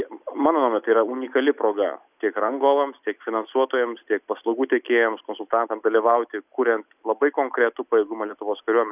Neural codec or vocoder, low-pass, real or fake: none; 3.6 kHz; real